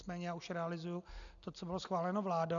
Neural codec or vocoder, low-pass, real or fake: none; 7.2 kHz; real